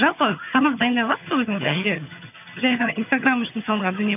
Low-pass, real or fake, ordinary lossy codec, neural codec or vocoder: 3.6 kHz; fake; none; vocoder, 22.05 kHz, 80 mel bands, HiFi-GAN